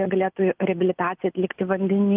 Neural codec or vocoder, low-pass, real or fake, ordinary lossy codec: none; 3.6 kHz; real; Opus, 24 kbps